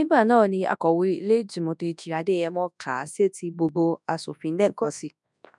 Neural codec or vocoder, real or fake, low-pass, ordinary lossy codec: codec, 24 kHz, 0.9 kbps, WavTokenizer, large speech release; fake; 10.8 kHz; none